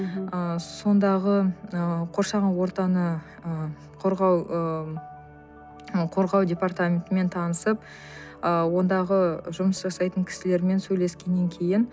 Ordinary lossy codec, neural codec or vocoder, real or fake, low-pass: none; none; real; none